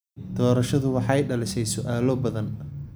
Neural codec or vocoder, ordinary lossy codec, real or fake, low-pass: none; none; real; none